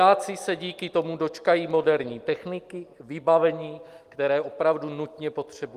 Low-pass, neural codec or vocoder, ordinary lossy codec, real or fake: 14.4 kHz; none; Opus, 32 kbps; real